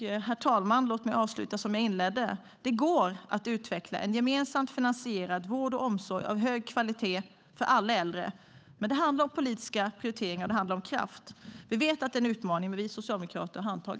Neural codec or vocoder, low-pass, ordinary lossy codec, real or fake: codec, 16 kHz, 8 kbps, FunCodec, trained on Chinese and English, 25 frames a second; none; none; fake